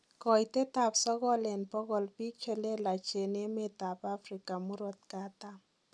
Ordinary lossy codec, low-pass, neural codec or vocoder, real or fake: none; none; none; real